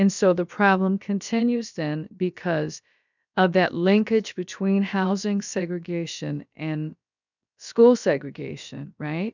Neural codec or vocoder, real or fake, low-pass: codec, 16 kHz, about 1 kbps, DyCAST, with the encoder's durations; fake; 7.2 kHz